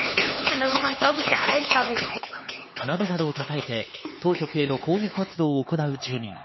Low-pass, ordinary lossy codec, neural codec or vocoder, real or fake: 7.2 kHz; MP3, 24 kbps; codec, 16 kHz, 4 kbps, X-Codec, HuBERT features, trained on LibriSpeech; fake